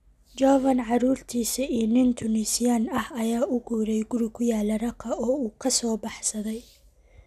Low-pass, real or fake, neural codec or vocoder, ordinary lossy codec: 14.4 kHz; real; none; none